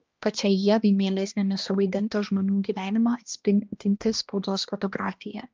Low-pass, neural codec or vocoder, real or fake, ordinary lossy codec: 7.2 kHz; codec, 16 kHz, 1 kbps, X-Codec, HuBERT features, trained on balanced general audio; fake; Opus, 32 kbps